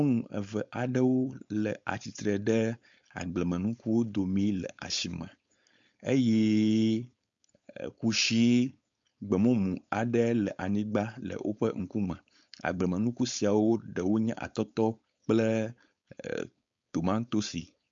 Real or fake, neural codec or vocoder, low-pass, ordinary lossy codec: fake; codec, 16 kHz, 4.8 kbps, FACodec; 7.2 kHz; MP3, 64 kbps